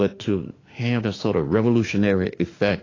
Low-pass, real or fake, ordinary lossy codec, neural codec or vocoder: 7.2 kHz; fake; AAC, 32 kbps; autoencoder, 48 kHz, 32 numbers a frame, DAC-VAE, trained on Japanese speech